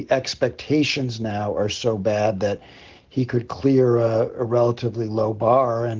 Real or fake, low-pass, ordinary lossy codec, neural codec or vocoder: real; 7.2 kHz; Opus, 16 kbps; none